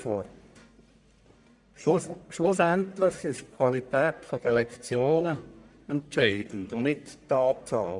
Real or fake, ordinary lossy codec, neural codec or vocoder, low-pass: fake; none; codec, 44.1 kHz, 1.7 kbps, Pupu-Codec; 10.8 kHz